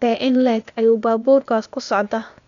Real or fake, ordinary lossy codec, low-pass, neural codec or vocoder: fake; none; 7.2 kHz; codec, 16 kHz, 0.8 kbps, ZipCodec